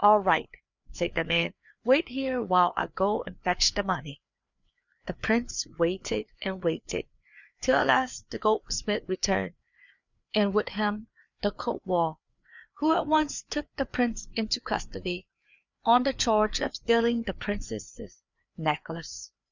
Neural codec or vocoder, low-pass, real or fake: codec, 16 kHz, 4 kbps, FreqCodec, larger model; 7.2 kHz; fake